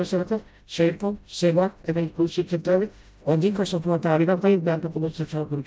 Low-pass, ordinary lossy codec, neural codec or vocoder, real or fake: none; none; codec, 16 kHz, 0.5 kbps, FreqCodec, smaller model; fake